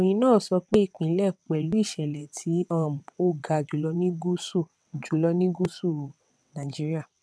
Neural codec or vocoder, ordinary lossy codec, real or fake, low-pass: vocoder, 22.05 kHz, 80 mel bands, WaveNeXt; none; fake; none